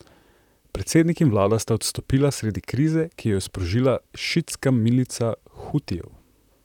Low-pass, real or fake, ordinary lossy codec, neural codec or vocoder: 19.8 kHz; fake; none; vocoder, 44.1 kHz, 128 mel bands, Pupu-Vocoder